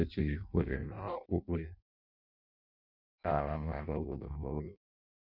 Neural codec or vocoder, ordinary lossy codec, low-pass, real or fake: codec, 16 kHz in and 24 kHz out, 0.6 kbps, FireRedTTS-2 codec; none; 5.4 kHz; fake